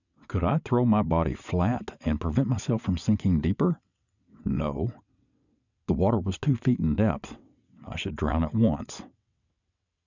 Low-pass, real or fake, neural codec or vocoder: 7.2 kHz; fake; vocoder, 22.05 kHz, 80 mel bands, WaveNeXt